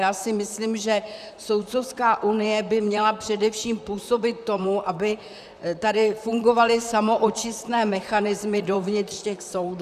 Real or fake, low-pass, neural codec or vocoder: fake; 14.4 kHz; vocoder, 44.1 kHz, 128 mel bands, Pupu-Vocoder